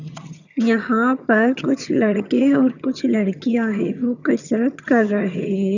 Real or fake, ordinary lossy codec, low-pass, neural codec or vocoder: fake; none; 7.2 kHz; vocoder, 22.05 kHz, 80 mel bands, HiFi-GAN